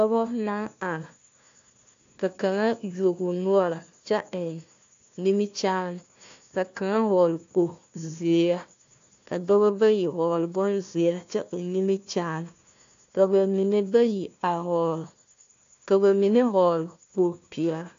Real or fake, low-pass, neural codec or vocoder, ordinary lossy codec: fake; 7.2 kHz; codec, 16 kHz, 1 kbps, FunCodec, trained on Chinese and English, 50 frames a second; AAC, 48 kbps